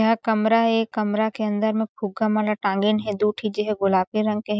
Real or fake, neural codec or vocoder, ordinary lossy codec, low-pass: real; none; none; none